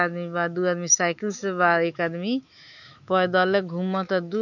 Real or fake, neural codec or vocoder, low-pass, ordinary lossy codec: fake; autoencoder, 48 kHz, 128 numbers a frame, DAC-VAE, trained on Japanese speech; 7.2 kHz; none